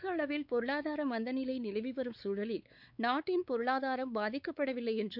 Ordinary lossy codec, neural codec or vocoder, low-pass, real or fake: none; codec, 16 kHz, 4 kbps, X-Codec, WavLM features, trained on Multilingual LibriSpeech; 5.4 kHz; fake